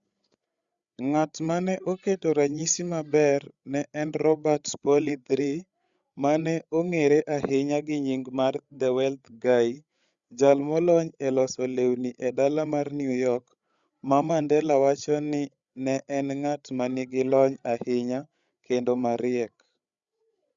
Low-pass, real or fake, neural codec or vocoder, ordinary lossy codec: 7.2 kHz; fake; codec, 16 kHz, 8 kbps, FreqCodec, larger model; Opus, 64 kbps